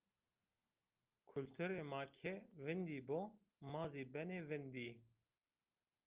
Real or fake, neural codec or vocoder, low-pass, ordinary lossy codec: real; none; 3.6 kHz; Opus, 24 kbps